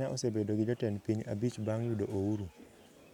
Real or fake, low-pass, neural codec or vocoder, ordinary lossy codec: real; 19.8 kHz; none; none